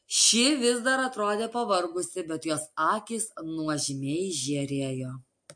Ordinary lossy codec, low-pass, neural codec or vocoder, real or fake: MP3, 48 kbps; 9.9 kHz; none; real